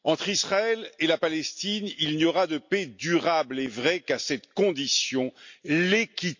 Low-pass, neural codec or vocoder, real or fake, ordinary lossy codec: 7.2 kHz; none; real; MP3, 48 kbps